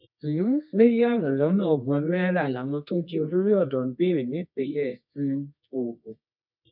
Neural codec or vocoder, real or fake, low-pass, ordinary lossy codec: codec, 24 kHz, 0.9 kbps, WavTokenizer, medium music audio release; fake; 5.4 kHz; none